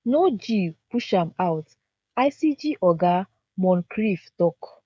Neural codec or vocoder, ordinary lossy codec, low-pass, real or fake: codec, 16 kHz, 16 kbps, FreqCodec, smaller model; none; none; fake